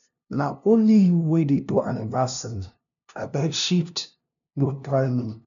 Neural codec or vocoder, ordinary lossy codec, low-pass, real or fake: codec, 16 kHz, 0.5 kbps, FunCodec, trained on LibriTTS, 25 frames a second; none; 7.2 kHz; fake